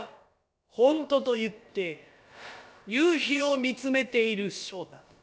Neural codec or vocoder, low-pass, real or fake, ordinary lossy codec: codec, 16 kHz, about 1 kbps, DyCAST, with the encoder's durations; none; fake; none